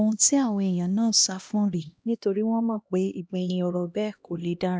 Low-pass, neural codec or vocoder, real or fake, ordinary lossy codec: none; codec, 16 kHz, 1 kbps, X-Codec, HuBERT features, trained on LibriSpeech; fake; none